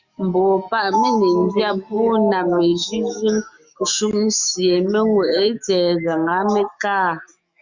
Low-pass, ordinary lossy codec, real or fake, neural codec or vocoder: 7.2 kHz; Opus, 64 kbps; fake; autoencoder, 48 kHz, 128 numbers a frame, DAC-VAE, trained on Japanese speech